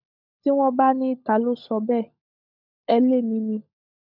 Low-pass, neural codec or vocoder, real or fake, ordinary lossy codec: 5.4 kHz; codec, 16 kHz, 16 kbps, FunCodec, trained on LibriTTS, 50 frames a second; fake; none